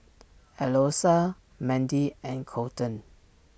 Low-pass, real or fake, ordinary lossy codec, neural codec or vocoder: none; real; none; none